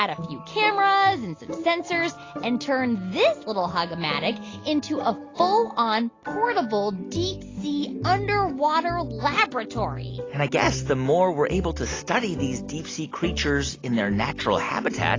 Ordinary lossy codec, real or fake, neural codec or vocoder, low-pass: AAC, 32 kbps; real; none; 7.2 kHz